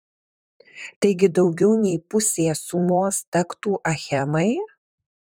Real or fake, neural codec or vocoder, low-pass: fake; vocoder, 44.1 kHz, 128 mel bands, Pupu-Vocoder; 19.8 kHz